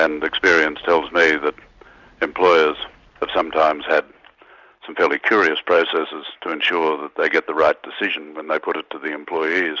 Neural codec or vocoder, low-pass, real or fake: none; 7.2 kHz; real